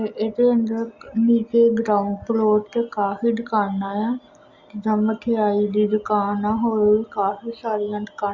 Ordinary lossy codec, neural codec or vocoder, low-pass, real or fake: Opus, 64 kbps; none; 7.2 kHz; real